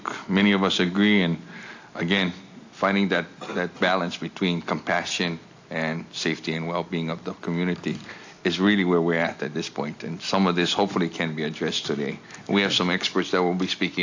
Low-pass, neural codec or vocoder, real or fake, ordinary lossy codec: 7.2 kHz; none; real; AAC, 48 kbps